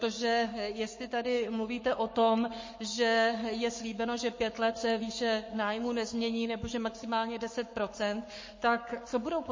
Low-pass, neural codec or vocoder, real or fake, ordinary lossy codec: 7.2 kHz; codec, 44.1 kHz, 7.8 kbps, Pupu-Codec; fake; MP3, 32 kbps